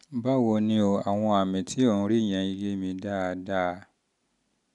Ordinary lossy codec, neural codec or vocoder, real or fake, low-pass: none; none; real; 10.8 kHz